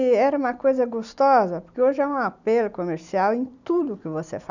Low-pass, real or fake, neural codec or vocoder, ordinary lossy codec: 7.2 kHz; real; none; none